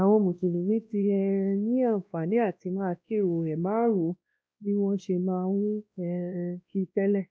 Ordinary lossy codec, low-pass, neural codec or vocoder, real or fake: none; none; codec, 16 kHz, 1 kbps, X-Codec, WavLM features, trained on Multilingual LibriSpeech; fake